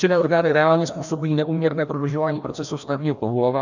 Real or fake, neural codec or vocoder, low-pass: fake; codec, 16 kHz, 1 kbps, FreqCodec, larger model; 7.2 kHz